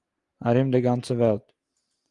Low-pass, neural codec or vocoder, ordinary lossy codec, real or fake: 10.8 kHz; none; Opus, 24 kbps; real